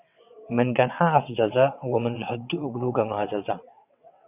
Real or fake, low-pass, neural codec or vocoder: fake; 3.6 kHz; vocoder, 22.05 kHz, 80 mel bands, WaveNeXt